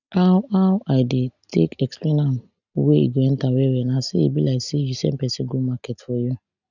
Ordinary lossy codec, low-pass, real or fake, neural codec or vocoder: none; 7.2 kHz; real; none